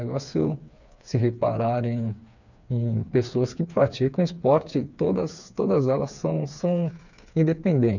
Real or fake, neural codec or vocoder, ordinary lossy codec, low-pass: fake; codec, 16 kHz, 4 kbps, FreqCodec, smaller model; none; 7.2 kHz